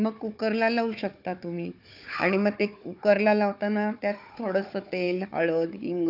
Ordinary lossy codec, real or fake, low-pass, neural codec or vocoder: none; fake; 5.4 kHz; codec, 16 kHz, 4 kbps, FunCodec, trained on Chinese and English, 50 frames a second